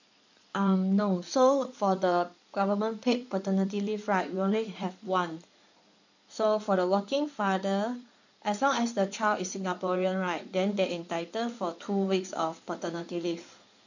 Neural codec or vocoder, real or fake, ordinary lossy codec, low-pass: codec, 16 kHz in and 24 kHz out, 2.2 kbps, FireRedTTS-2 codec; fake; none; 7.2 kHz